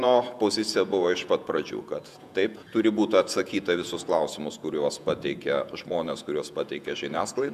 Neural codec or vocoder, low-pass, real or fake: vocoder, 48 kHz, 128 mel bands, Vocos; 14.4 kHz; fake